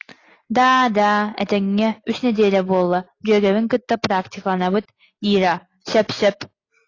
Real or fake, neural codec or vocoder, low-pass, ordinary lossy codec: real; none; 7.2 kHz; AAC, 32 kbps